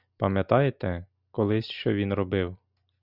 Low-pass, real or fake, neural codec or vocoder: 5.4 kHz; real; none